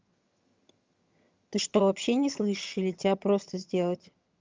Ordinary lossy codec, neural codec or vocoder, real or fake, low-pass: Opus, 32 kbps; vocoder, 22.05 kHz, 80 mel bands, HiFi-GAN; fake; 7.2 kHz